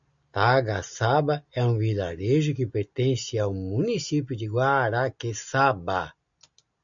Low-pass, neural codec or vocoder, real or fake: 7.2 kHz; none; real